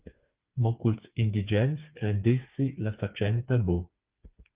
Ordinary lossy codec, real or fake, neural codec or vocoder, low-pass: Opus, 32 kbps; fake; codec, 16 kHz, 4 kbps, FreqCodec, smaller model; 3.6 kHz